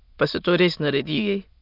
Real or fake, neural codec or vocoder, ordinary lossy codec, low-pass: fake; autoencoder, 22.05 kHz, a latent of 192 numbers a frame, VITS, trained on many speakers; none; 5.4 kHz